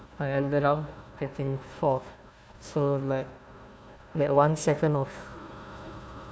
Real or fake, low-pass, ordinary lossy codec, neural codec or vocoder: fake; none; none; codec, 16 kHz, 1 kbps, FunCodec, trained on Chinese and English, 50 frames a second